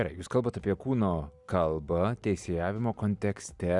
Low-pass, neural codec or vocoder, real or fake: 10.8 kHz; none; real